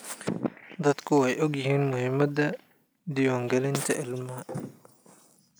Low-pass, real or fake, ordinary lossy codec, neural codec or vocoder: none; real; none; none